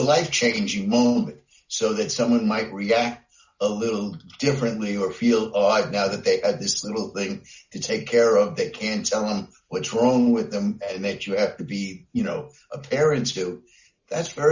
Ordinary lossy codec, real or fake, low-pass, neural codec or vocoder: Opus, 64 kbps; real; 7.2 kHz; none